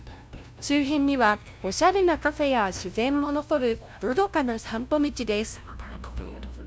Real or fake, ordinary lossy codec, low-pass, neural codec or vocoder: fake; none; none; codec, 16 kHz, 0.5 kbps, FunCodec, trained on LibriTTS, 25 frames a second